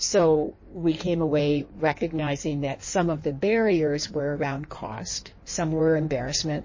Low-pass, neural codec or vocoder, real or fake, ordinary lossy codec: 7.2 kHz; codec, 16 kHz in and 24 kHz out, 1.1 kbps, FireRedTTS-2 codec; fake; MP3, 32 kbps